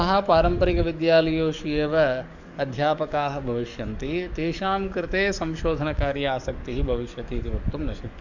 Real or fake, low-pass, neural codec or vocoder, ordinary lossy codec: fake; 7.2 kHz; codec, 44.1 kHz, 7.8 kbps, DAC; none